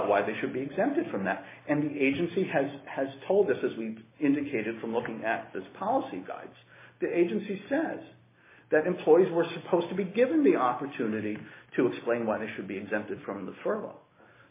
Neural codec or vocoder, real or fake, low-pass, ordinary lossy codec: none; real; 3.6 kHz; MP3, 16 kbps